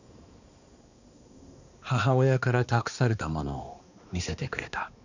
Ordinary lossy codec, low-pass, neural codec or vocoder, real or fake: none; 7.2 kHz; codec, 16 kHz, 2 kbps, X-Codec, HuBERT features, trained on balanced general audio; fake